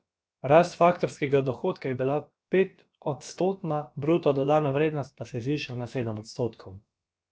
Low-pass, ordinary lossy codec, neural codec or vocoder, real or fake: none; none; codec, 16 kHz, about 1 kbps, DyCAST, with the encoder's durations; fake